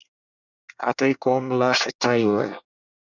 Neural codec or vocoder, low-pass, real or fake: codec, 24 kHz, 1 kbps, SNAC; 7.2 kHz; fake